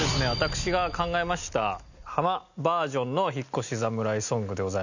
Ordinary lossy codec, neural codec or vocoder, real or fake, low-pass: none; none; real; 7.2 kHz